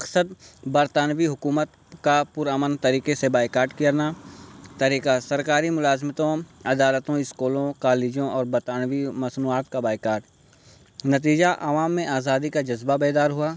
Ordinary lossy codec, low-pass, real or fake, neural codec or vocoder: none; none; real; none